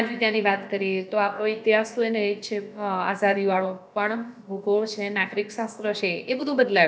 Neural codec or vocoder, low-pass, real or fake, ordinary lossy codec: codec, 16 kHz, about 1 kbps, DyCAST, with the encoder's durations; none; fake; none